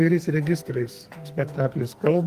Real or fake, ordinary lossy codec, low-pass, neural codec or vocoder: fake; Opus, 24 kbps; 14.4 kHz; codec, 44.1 kHz, 2.6 kbps, SNAC